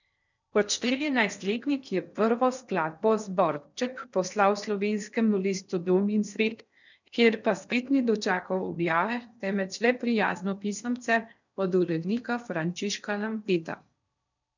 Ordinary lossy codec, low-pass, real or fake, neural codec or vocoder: none; 7.2 kHz; fake; codec, 16 kHz in and 24 kHz out, 0.6 kbps, FocalCodec, streaming, 4096 codes